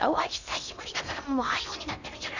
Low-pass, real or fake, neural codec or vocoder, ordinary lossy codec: 7.2 kHz; fake; codec, 16 kHz in and 24 kHz out, 0.6 kbps, FocalCodec, streaming, 4096 codes; none